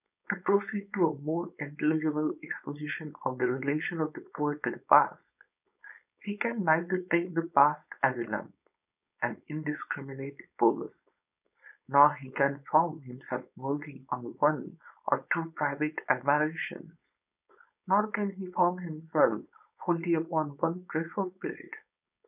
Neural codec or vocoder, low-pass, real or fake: codec, 16 kHz, 4.8 kbps, FACodec; 3.6 kHz; fake